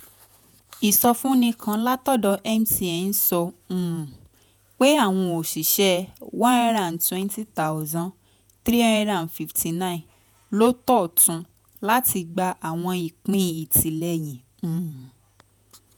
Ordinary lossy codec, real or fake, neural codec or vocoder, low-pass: none; fake; vocoder, 48 kHz, 128 mel bands, Vocos; none